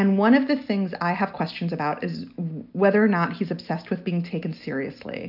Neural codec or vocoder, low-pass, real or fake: none; 5.4 kHz; real